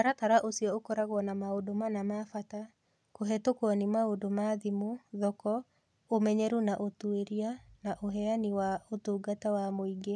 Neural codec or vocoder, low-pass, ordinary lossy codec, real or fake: none; none; none; real